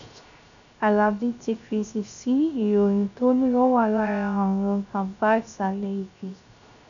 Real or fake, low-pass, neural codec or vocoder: fake; 7.2 kHz; codec, 16 kHz, 0.3 kbps, FocalCodec